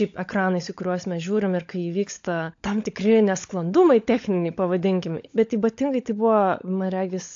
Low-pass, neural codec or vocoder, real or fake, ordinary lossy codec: 7.2 kHz; codec, 16 kHz, 16 kbps, FunCodec, trained on LibriTTS, 50 frames a second; fake; AAC, 48 kbps